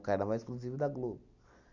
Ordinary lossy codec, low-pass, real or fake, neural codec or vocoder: none; 7.2 kHz; real; none